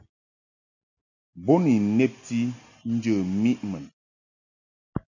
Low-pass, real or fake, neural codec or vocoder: 7.2 kHz; real; none